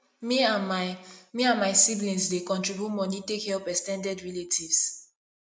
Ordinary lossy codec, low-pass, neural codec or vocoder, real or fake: none; none; none; real